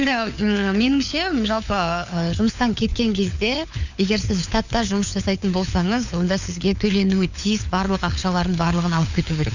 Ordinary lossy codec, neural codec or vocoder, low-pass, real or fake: none; codec, 16 kHz, 4 kbps, FunCodec, trained on LibriTTS, 50 frames a second; 7.2 kHz; fake